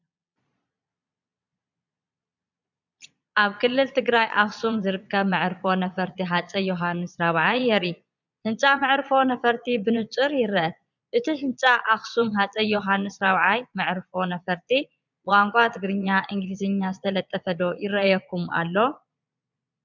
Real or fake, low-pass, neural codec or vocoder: fake; 7.2 kHz; vocoder, 22.05 kHz, 80 mel bands, Vocos